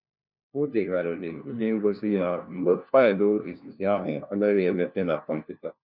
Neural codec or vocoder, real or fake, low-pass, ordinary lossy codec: codec, 16 kHz, 1 kbps, FunCodec, trained on LibriTTS, 50 frames a second; fake; 5.4 kHz; none